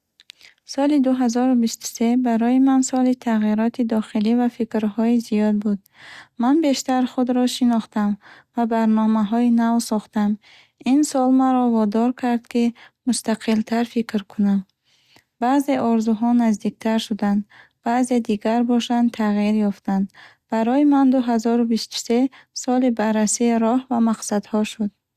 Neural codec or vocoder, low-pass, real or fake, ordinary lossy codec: none; 14.4 kHz; real; Opus, 64 kbps